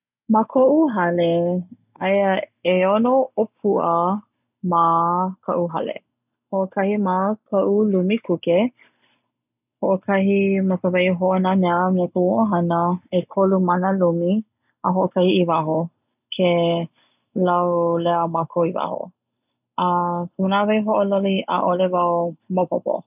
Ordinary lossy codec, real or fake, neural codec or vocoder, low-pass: none; real; none; 3.6 kHz